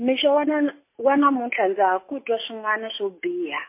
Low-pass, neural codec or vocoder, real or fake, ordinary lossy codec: 3.6 kHz; none; real; MP3, 24 kbps